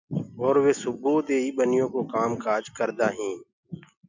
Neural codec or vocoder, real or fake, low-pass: none; real; 7.2 kHz